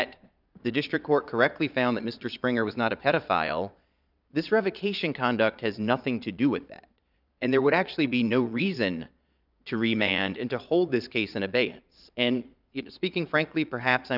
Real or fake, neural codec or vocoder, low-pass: fake; vocoder, 22.05 kHz, 80 mel bands, Vocos; 5.4 kHz